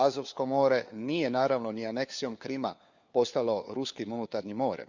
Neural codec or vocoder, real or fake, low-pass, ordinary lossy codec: codec, 16 kHz, 4 kbps, FunCodec, trained on LibriTTS, 50 frames a second; fake; 7.2 kHz; Opus, 64 kbps